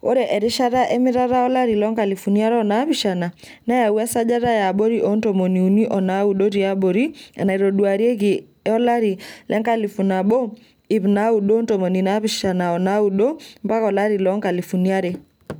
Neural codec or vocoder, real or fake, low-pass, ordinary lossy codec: none; real; none; none